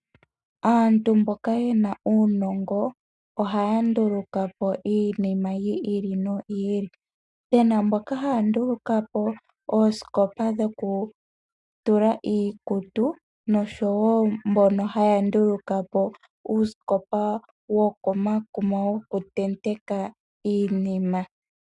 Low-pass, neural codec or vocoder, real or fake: 10.8 kHz; none; real